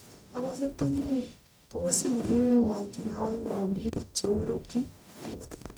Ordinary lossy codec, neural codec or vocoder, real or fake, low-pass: none; codec, 44.1 kHz, 0.9 kbps, DAC; fake; none